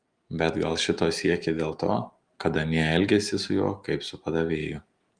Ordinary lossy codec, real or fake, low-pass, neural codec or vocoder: Opus, 32 kbps; real; 9.9 kHz; none